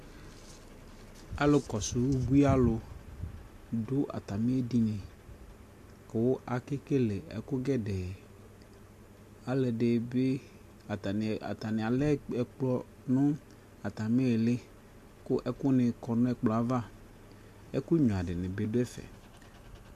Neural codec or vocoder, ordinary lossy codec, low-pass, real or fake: none; MP3, 64 kbps; 14.4 kHz; real